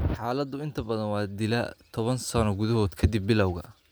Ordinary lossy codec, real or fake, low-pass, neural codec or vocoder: none; real; none; none